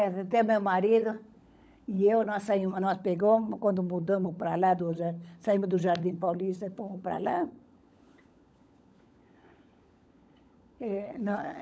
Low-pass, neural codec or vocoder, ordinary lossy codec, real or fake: none; codec, 16 kHz, 16 kbps, FunCodec, trained on LibriTTS, 50 frames a second; none; fake